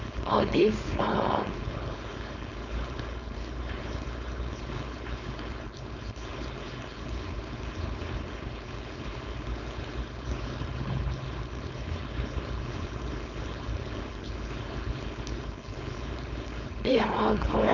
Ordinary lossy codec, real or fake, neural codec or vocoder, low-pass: none; fake; codec, 16 kHz, 4.8 kbps, FACodec; 7.2 kHz